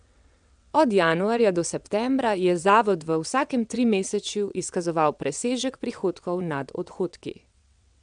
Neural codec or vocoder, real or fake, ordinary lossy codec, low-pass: vocoder, 22.05 kHz, 80 mel bands, WaveNeXt; fake; MP3, 96 kbps; 9.9 kHz